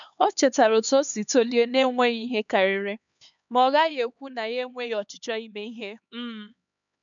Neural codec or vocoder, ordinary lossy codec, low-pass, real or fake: codec, 16 kHz, 4 kbps, X-Codec, HuBERT features, trained on LibriSpeech; none; 7.2 kHz; fake